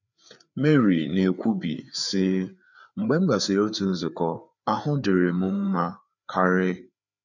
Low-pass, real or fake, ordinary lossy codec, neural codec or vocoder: 7.2 kHz; fake; none; codec, 16 kHz, 4 kbps, FreqCodec, larger model